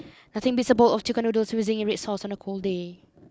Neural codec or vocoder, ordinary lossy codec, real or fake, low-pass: none; none; real; none